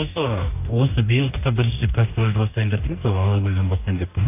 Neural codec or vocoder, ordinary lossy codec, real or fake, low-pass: codec, 44.1 kHz, 2.6 kbps, DAC; AAC, 32 kbps; fake; 3.6 kHz